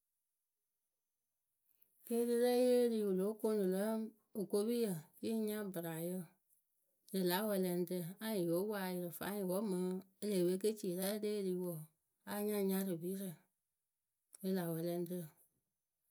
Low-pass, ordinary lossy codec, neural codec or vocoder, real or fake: none; none; none; real